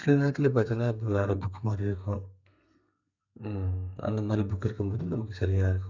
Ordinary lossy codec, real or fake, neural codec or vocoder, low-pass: none; fake; codec, 32 kHz, 1.9 kbps, SNAC; 7.2 kHz